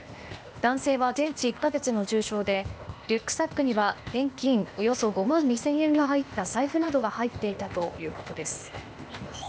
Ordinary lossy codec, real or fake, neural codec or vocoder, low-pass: none; fake; codec, 16 kHz, 0.8 kbps, ZipCodec; none